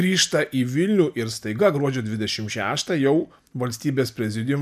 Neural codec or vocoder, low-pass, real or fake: none; 14.4 kHz; real